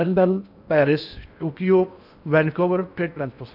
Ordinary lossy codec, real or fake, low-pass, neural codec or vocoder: none; fake; 5.4 kHz; codec, 16 kHz in and 24 kHz out, 0.6 kbps, FocalCodec, streaming, 4096 codes